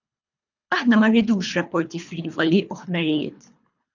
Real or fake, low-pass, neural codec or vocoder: fake; 7.2 kHz; codec, 24 kHz, 3 kbps, HILCodec